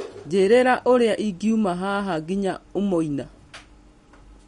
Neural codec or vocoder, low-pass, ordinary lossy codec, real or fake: none; 19.8 kHz; MP3, 48 kbps; real